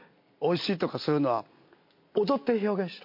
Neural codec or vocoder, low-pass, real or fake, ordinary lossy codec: none; 5.4 kHz; real; MP3, 32 kbps